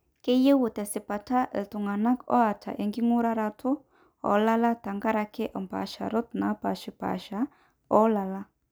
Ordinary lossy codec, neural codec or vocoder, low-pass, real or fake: none; none; none; real